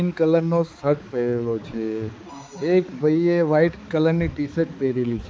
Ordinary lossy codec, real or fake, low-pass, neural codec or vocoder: none; fake; none; codec, 16 kHz, 4 kbps, X-Codec, HuBERT features, trained on balanced general audio